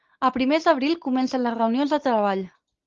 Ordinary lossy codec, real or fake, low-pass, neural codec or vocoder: Opus, 32 kbps; real; 7.2 kHz; none